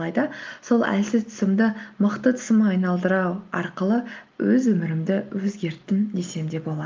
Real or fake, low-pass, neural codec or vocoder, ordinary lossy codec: real; 7.2 kHz; none; Opus, 32 kbps